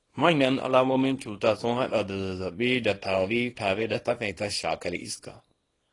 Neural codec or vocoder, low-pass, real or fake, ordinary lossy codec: codec, 24 kHz, 0.9 kbps, WavTokenizer, small release; 10.8 kHz; fake; AAC, 32 kbps